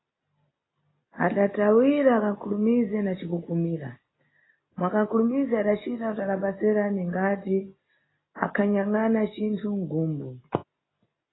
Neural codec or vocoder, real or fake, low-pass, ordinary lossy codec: none; real; 7.2 kHz; AAC, 16 kbps